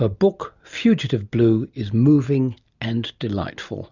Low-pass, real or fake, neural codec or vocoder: 7.2 kHz; real; none